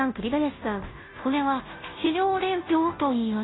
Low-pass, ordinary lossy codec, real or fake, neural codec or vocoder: 7.2 kHz; AAC, 16 kbps; fake; codec, 16 kHz, 0.5 kbps, FunCodec, trained on Chinese and English, 25 frames a second